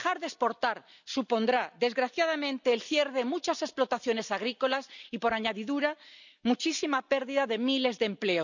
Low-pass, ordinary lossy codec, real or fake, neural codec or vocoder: 7.2 kHz; none; real; none